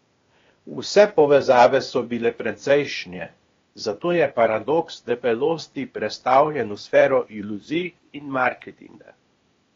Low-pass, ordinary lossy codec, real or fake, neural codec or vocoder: 7.2 kHz; AAC, 32 kbps; fake; codec, 16 kHz, 0.8 kbps, ZipCodec